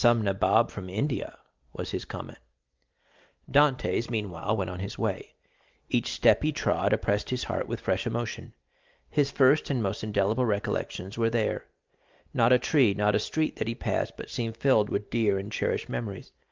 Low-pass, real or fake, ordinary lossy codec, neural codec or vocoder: 7.2 kHz; real; Opus, 32 kbps; none